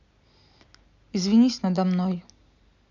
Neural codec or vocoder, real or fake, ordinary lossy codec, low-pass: none; real; none; 7.2 kHz